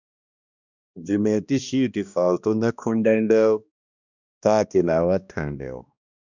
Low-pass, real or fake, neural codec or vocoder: 7.2 kHz; fake; codec, 16 kHz, 1 kbps, X-Codec, HuBERT features, trained on balanced general audio